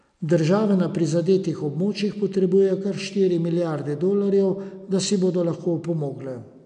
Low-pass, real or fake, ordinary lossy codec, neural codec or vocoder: 9.9 kHz; real; none; none